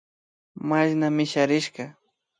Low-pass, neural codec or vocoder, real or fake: 9.9 kHz; none; real